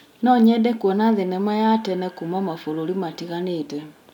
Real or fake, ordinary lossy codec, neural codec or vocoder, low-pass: real; none; none; 19.8 kHz